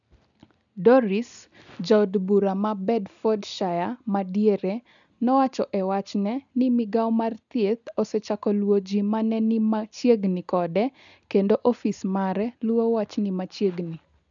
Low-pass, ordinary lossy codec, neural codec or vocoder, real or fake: 7.2 kHz; none; none; real